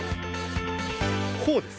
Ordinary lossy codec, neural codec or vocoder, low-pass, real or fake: none; none; none; real